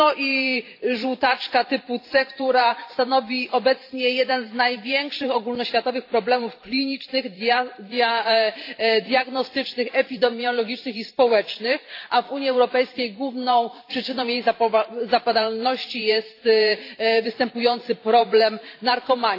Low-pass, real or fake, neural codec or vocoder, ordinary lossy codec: 5.4 kHz; fake; vocoder, 44.1 kHz, 128 mel bands every 512 samples, BigVGAN v2; AAC, 32 kbps